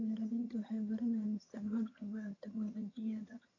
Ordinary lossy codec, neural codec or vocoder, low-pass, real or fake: AAC, 32 kbps; vocoder, 22.05 kHz, 80 mel bands, HiFi-GAN; 7.2 kHz; fake